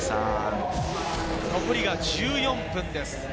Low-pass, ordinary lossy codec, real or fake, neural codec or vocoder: none; none; real; none